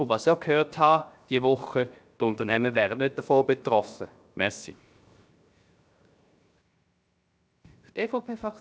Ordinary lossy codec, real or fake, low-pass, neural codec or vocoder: none; fake; none; codec, 16 kHz, 0.7 kbps, FocalCodec